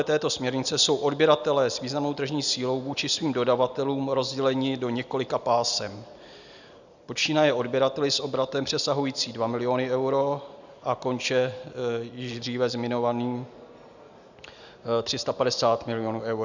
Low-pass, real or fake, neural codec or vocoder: 7.2 kHz; real; none